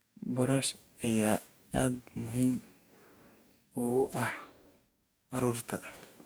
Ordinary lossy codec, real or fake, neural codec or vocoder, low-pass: none; fake; codec, 44.1 kHz, 2.6 kbps, DAC; none